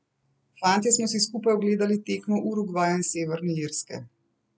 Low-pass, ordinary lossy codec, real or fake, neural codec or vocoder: none; none; real; none